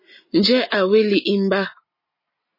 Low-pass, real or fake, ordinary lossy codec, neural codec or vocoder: 5.4 kHz; real; MP3, 24 kbps; none